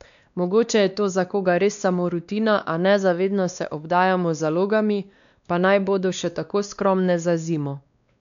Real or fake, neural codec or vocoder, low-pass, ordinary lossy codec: fake; codec, 16 kHz, 2 kbps, X-Codec, WavLM features, trained on Multilingual LibriSpeech; 7.2 kHz; none